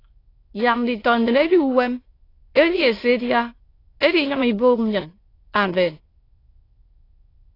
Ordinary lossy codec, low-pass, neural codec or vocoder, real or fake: AAC, 24 kbps; 5.4 kHz; autoencoder, 22.05 kHz, a latent of 192 numbers a frame, VITS, trained on many speakers; fake